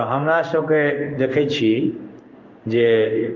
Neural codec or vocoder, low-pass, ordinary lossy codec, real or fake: codec, 16 kHz in and 24 kHz out, 1 kbps, XY-Tokenizer; 7.2 kHz; Opus, 24 kbps; fake